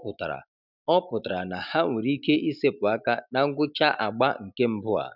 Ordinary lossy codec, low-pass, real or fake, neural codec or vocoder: none; 5.4 kHz; real; none